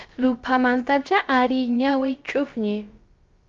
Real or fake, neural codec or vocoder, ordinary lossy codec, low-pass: fake; codec, 16 kHz, about 1 kbps, DyCAST, with the encoder's durations; Opus, 32 kbps; 7.2 kHz